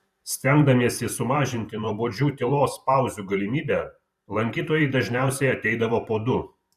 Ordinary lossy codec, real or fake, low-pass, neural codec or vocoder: Opus, 64 kbps; fake; 14.4 kHz; vocoder, 44.1 kHz, 128 mel bands every 512 samples, BigVGAN v2